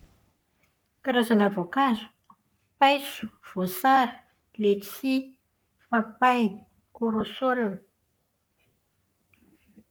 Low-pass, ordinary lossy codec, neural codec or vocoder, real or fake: none; none; codec, 44.1 kHz, 3.4 kbps, Pupu-Codec; fake